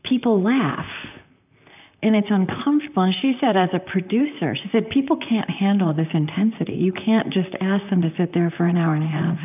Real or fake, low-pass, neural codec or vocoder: fake; 3.6 kHz; vocoder, 44.1 kHz, 128 mel bands, Pupu-Vocoder